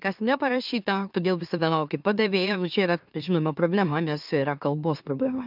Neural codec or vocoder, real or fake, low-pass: autoencoder, 44.1 kHz, a latent of 192 numbers a frame, MeloTTS; fake; 5.4 kHz